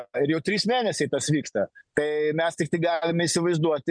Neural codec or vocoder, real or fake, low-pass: none; real; 9.9 kHz